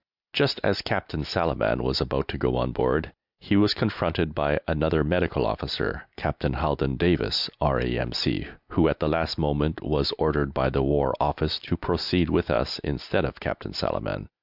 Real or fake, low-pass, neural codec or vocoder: real; 5.4 kHz; none